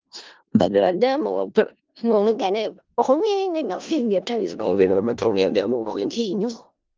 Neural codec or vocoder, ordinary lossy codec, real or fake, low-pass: codec, 16 kHz in and 24 kHz out, 0.4 kbps, LongCat-Audio-Codec, four codebook decoder; Opus, 32 kbps; fake; 7.2 kHz